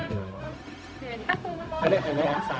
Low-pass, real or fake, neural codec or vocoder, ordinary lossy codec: none; fake; codec, 16 kHz, 0.4 kbps, LongCat-Audio-Codec; none